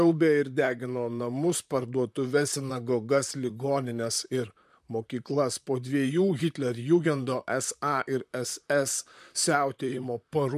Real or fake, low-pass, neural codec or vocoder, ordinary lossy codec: fake; 14.4 kHz; vocoder, 44.1 kHz, 128 mel bands, Pupu-Vocoder; MP3, 96 kbps